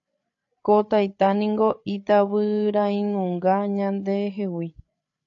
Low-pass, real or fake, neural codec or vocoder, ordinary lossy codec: 7.2 kHz; fake; codec, 16 kHz, 8 kbps, FreqCodec, larger model; AAC, 64 kbps